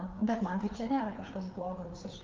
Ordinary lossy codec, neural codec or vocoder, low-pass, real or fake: Opus, 32 kbps; codec, 16 kHz, 4 kbps, FreqCodec, larger model; 7.2 kHz; fake